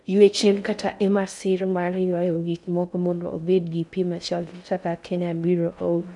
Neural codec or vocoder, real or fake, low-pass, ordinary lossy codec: codec, 16 kHz in and 24 kHz out, 0.6 kbps, FocalCodec, streaming, 4096 codes; fake; 10.8 kHz; none